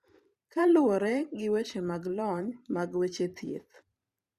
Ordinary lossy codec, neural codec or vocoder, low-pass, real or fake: Opus, 64 kbps; vocoder, 44.1 kHz, 128 mel bands every 512 samples, BigVGAN v2; 14.4 kHz; fake